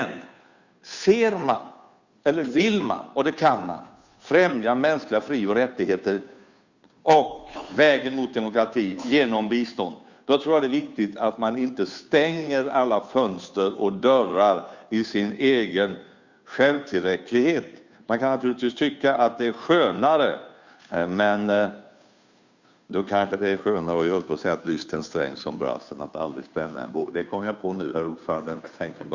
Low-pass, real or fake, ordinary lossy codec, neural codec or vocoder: 7.2 kHz; fake; Opus, 64 kbps; codec, 16 kHz, 2 kbps, FunCodec, trained on Chinese and English, 25 frames a second